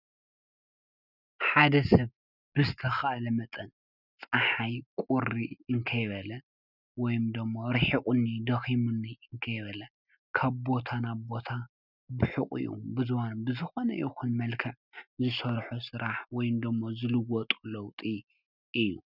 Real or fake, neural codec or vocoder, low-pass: real; none; 5.4 kHz